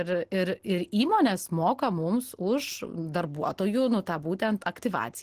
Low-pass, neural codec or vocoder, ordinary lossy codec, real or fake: 14.4 kHz; none; Opus, 16 kbps; real